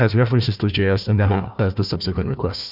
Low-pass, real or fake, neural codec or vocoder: 5.4 kHz; fake; codec, 16 kHz, 1 kbps, FunCodec, trained on Chinese and English, 50 frames a second